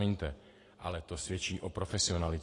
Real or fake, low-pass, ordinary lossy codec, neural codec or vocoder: real; 10.8 kHz; AAC, 32 kbps; none